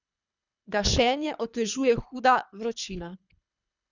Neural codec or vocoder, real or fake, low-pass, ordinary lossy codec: codec, 24 kHz, 3 kbps, HILCodec; fake; 7.2 kHz; none